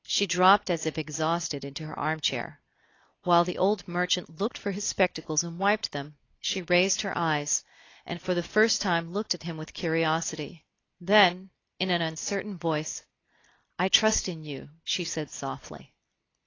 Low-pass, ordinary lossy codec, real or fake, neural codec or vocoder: 7.2 kHz; AAC, 32 kbps; real; none